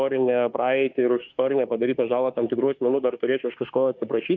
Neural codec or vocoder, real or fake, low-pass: autoencoder, 48 kHz, 32 numbers a frame, DAC-VAE, trained on Japanese speech; fake; 7.2 kHz